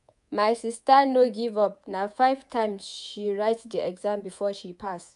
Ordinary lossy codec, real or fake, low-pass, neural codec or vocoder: none; fake; 10.8 kHz; codec, 24 kHz, 3.1 kbps, DualCodec